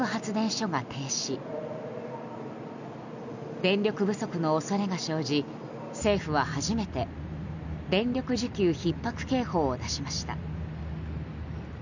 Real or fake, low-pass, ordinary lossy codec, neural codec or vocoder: real; 7.2 kHz; none; none